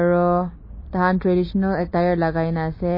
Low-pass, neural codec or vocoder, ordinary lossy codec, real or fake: 5.4 kHz; none; MP3, 24 kbps; real